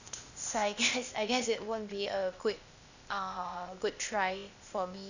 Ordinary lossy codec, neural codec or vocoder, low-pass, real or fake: none; codec, 16 kHz, 0.8 kbps, ZipCodec; 7.2 kHz; fake